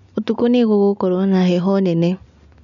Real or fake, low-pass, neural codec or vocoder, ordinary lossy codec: real; 7.2 kHz; none; none